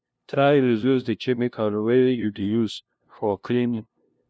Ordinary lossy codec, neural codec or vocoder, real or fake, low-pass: none; codec, 16 kHz, 0.5 kbps, FunCodec, trained on LibriTTS, 25 frames a second; fake; none